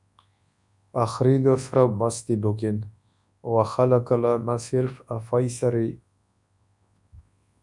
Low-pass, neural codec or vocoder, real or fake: 10.8 kHz; codec, 24 kHz, 0.9 kbps, WavTokenizer, large speech release; fake